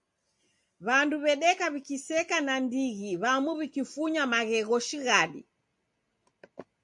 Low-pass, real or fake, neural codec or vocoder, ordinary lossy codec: 10.8 kHz; real; none; MP3, 64 kbps